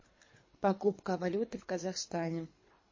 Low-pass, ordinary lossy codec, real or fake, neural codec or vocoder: 7.2 kHz; MP3, 32 kbps; fake; codec, 24 kHz, 3 kbps, HILCodec